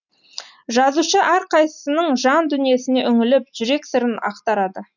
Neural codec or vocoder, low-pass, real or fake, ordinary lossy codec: none; 7.2 kHz; real; none